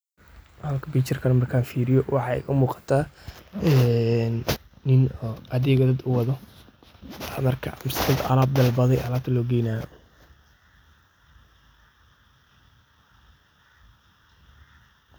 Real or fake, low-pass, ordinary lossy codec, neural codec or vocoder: real; none; none; none